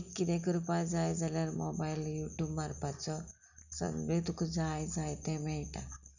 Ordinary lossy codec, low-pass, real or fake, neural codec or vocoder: none; 7.2 kHz; real; none